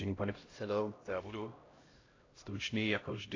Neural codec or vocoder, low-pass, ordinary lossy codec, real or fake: codec, 16 kHz, 0.5 kbps, X-Codec, HuBERT features, trained on LibriSpeech; 7.2 kHz; AAC, 32 kbps; fake